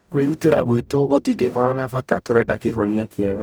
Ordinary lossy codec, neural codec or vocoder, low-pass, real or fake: none; codec, 44.1 kHz, 0.9 kbps, DAC; none; fake